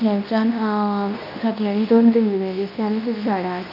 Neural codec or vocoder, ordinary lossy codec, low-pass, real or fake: codec, 24 kHz, 0.9 kbps, WavTokenizer, medium speech release version 2; none; 5.4 kHz; fake